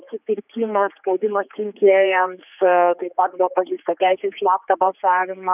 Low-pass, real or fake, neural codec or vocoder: 3.6 kHz; fake; codec, 16 kHz, 4 kbps, X-Codec, HuBERT features, trained on general audio